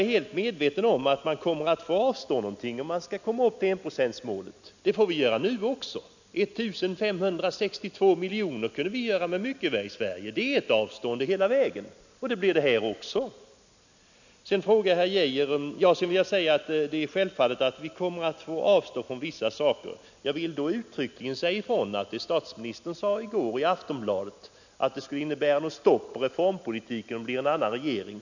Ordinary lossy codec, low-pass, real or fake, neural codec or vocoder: none; 7.2 kHz; real; none